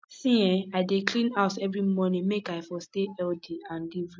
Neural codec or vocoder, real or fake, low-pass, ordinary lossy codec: none; real; none; none